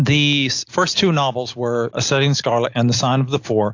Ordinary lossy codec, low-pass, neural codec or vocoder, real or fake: AAC, 48 kbps; 7.2 kHz; none; real